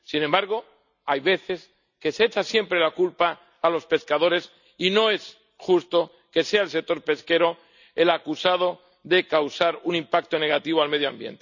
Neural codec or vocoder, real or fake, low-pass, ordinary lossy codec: none; real; 7.2 kHz; none